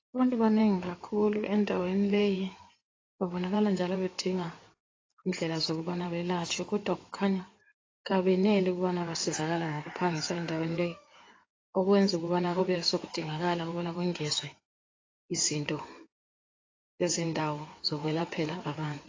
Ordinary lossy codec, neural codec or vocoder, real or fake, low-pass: AAC, 32 kbps; codec, 16 kHz in and 24 kHz out, 2.2 kbps, FireRedTTS-2 codec; fake; 7.2 kHz